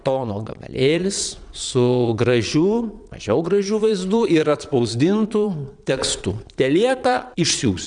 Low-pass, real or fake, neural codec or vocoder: 9.9 kHz; fake; vocoder, 22.05 kHz, 80 mel bands, Vocos